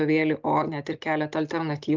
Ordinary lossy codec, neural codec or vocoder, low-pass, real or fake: Opus, 32 kbps; none; 7.2 kHz; real